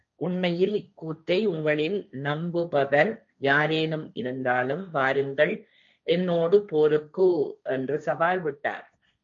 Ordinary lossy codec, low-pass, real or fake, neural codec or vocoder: MP3, 96 kbps; 7.2 kHz; fake; codec, 16 kHz, 1.1 kbps, Voila-Tokenizer